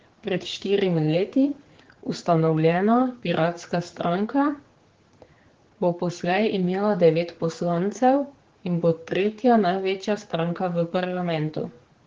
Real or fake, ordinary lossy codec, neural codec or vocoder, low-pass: fake; Opus, 16 kbps; codec, 16 kHz, 4 kbps, X-Codec, HuBERT features, trained on general audio; 7.2 kHz